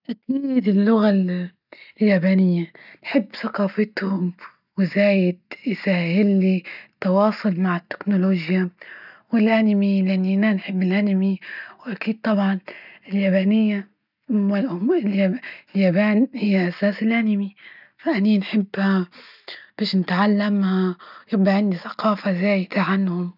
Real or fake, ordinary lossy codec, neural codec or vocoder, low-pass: real; none; none; 5.4 kHz